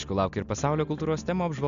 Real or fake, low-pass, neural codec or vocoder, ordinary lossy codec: real; 7.2 kHz; none; MP3, 64 kbps